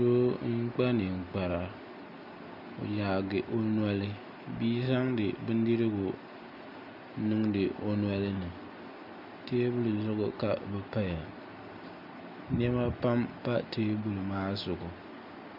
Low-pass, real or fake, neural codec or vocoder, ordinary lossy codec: 5.4 kHz; real; none; MP3, 48 kbps